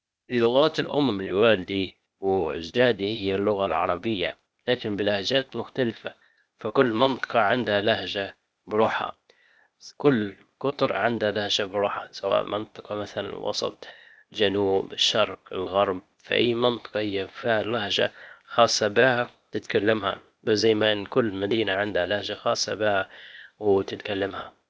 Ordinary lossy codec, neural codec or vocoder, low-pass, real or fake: none; codec, 16 kHz, 0.8 kbps, ZipCodec; none; fake